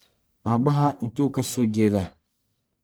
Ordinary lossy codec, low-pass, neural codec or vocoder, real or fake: none; none; codec, 44.1 kHz, 1.7 kbps, Pupu-Codec; fake